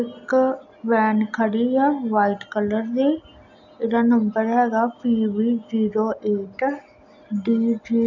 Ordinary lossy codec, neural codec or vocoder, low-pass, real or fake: none; none; 7.2 kHz; real